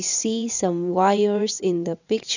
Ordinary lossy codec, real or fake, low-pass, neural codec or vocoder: none; fake; 7.2 kHz; vocoder, 22.05 kHz, 80 mel bands, WaveNeXt